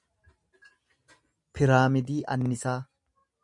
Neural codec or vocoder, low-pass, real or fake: none; 10.8 kHz; real